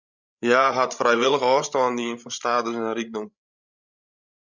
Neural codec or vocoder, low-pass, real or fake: codec, 16 kHz, 16 kbps, FreqCodec, larger model; 7.2 kHz; fake